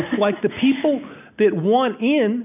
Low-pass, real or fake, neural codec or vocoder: 3.6 kHz; real; none